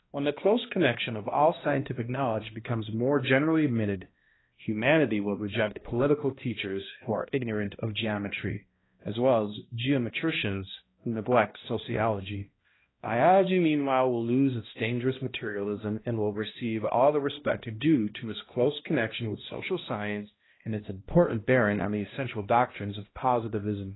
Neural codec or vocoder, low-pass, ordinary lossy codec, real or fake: codec, 16 kHz, 1 kbps, X-Codec, HuBERT features, trained on balanced general audio; 7.2 kHz; AAC, 16 kbps; fake